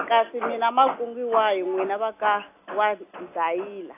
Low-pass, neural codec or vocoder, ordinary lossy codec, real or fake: 3.6 kHz; none; AAC, 24 kbps; real